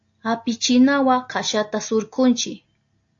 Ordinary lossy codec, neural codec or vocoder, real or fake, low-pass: AAC, 48 kbps; none; real; 7.2 kHz